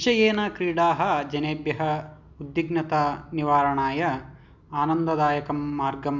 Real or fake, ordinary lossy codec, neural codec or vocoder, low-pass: real; none; none; 7.2 kHz